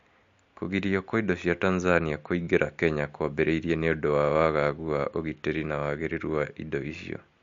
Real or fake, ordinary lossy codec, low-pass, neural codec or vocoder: real; MP3, 48 kbps; 7.2 kHz; none